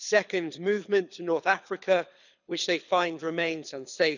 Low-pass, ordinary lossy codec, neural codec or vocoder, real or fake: 7.2 kHz; none; codec, 24 kHz, 6 kbps, HILCodec; fake